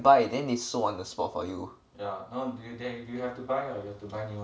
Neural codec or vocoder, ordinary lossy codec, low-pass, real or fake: none; none; none; real